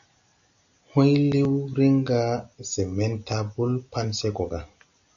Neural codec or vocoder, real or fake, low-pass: none; real; 7.2 kHz